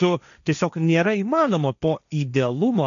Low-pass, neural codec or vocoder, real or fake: 7.2 kHz; codec, 16 kHz, 1.1 kbps, Voila-Tokenizer; fake